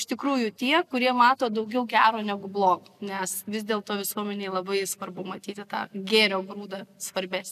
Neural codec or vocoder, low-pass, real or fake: none; 14.4 kHz; real